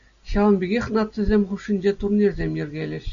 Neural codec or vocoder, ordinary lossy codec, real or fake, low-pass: none; MP3, 96 kbps; real; 7.2 kHz